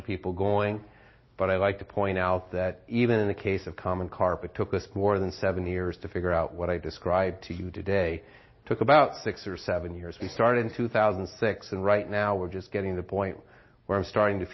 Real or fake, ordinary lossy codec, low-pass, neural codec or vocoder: real; MP3, 24 kbps; 7.2 kHz; none